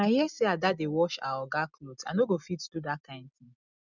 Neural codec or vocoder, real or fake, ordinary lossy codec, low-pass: none; real; none; 7.2 kHz